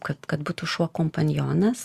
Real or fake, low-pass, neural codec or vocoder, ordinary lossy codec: real; 14.4 kHz; none; Opus, 64 kbps